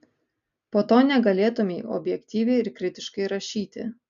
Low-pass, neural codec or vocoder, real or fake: 7.2 kHz; none; real